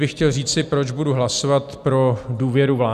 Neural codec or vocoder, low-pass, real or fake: none; 14.4 kHz; real